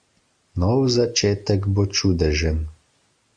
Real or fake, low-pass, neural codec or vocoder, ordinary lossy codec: real; 9.9 kHz; none; Opus, 64 kbps